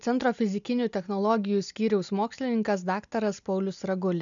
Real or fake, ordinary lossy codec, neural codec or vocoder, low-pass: real; MP3, 96 kbps; none; 7.2 kHz